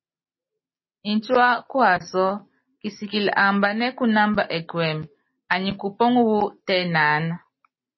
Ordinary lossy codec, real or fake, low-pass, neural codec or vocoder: MP3, 24 kbps; real; 7.2 kHz; none